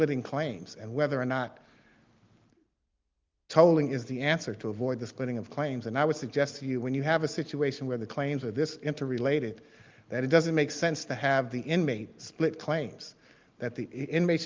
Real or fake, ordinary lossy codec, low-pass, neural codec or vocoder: real; Opus, 24 kbps; 7.2 kHz; none